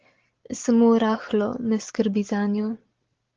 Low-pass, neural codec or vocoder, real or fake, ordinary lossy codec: 7.2 kHz; none; real; Opus, 16 kbps